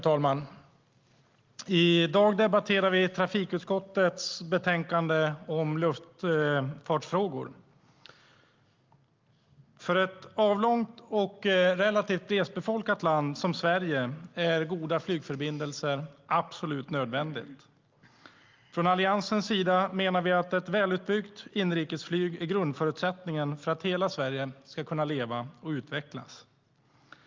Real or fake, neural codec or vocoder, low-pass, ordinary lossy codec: real; none; 7.2 kHz; Opus, 32 kbps